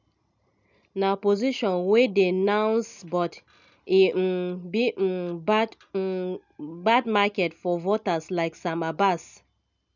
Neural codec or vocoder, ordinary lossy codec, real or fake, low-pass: none; none; real; 7.2 kHz